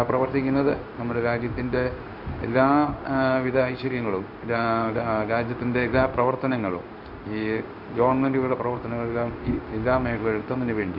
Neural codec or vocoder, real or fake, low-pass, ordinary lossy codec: codec, 16 kHz in and 24 kHz out, 1 kbps, XY-Tokenizer; fake; 5.4 kHz; none